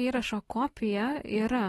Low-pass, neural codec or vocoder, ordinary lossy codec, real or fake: 19.8 kHz; none; AAC, 32 kbps; real